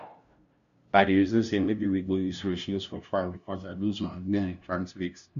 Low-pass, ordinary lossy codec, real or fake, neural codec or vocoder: 7.2 kHz; none; fake; codec, 16 kHz, 0.5 kbps, FunCodec, trained on LibriTTS, 25 frames a second